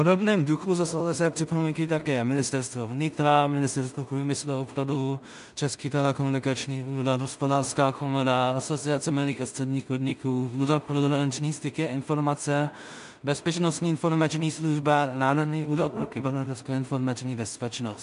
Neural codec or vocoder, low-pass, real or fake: codec, 16 kHz in and 24 kHz out, 0.4 kbps, LongCat-Audio-Codec, two codebook decoder; 10.8 kHz; fake